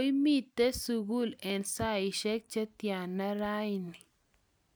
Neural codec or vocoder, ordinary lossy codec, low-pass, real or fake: none; none; none; real